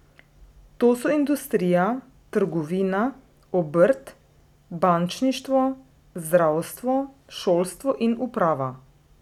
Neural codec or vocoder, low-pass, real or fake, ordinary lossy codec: none; 19.8 kHz; real; none